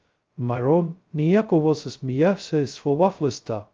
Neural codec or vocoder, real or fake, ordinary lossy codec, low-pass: codec, 16 kHz, 0.2 kbps, FocalCodec; fake; Opus, 24 kbps; 7.2 kHz